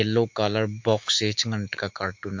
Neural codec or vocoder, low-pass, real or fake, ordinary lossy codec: autoencoder, 48 kHz, 128 numbers a frame, DAC-VAE, trained on Japanese speech; 7.2 kHz; fake; MP3, 48 kbps